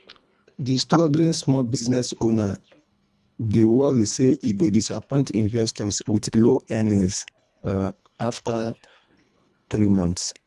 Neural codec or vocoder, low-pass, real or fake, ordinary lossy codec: codec, 24 kHz, 1.5 kbps, HILCodec; none; fake; none